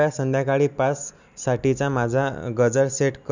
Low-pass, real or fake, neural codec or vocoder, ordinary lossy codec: 7.2 kHz; real; none; none